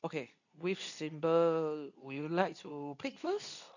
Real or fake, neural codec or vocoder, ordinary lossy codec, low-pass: fake; codec, 24 kHz, 0.9 kbps, WavTokenizer, medium speech release version 2; none; 7.2 kHz